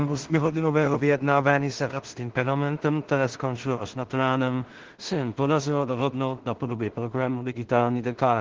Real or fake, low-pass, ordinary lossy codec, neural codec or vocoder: fake; 7.2 kHz; Opus, 16 kbps; codec, 16 kHz in and 24 kHz out, 0.4 kbps, LongCat-Audio-Codec, two codebook decoder